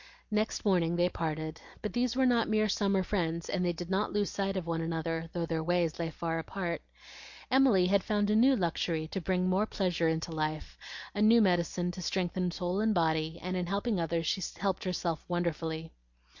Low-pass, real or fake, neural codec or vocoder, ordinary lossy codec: 7.2 kHz; real; none; MP3, 48 kbps